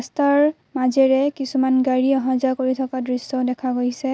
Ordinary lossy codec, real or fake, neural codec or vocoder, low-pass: none; real; none; none